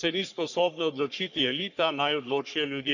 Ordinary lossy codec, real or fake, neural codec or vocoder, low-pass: none; fake; codec, 44.1 kHz, 3.4 kbps, Pupu-Codec; 7.2 kHz